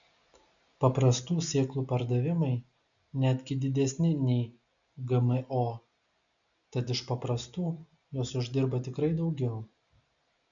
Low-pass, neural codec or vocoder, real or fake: 7.2 kHz; none; real